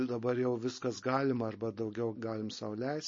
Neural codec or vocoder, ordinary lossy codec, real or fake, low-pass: none; MP3, 32 kbps; real; 7.2 kHz